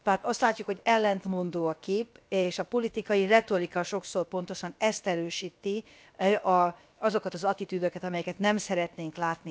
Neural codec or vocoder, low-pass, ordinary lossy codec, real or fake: codec, 16 kHz, about 1 kbps, DyCAST, with the encoder's durations; none; none; fake